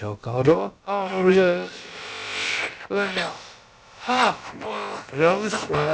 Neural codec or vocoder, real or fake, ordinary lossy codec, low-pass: codec, 16 kHz, about 1 kbps, DyCAST, with the encoder's durations; fake; none; none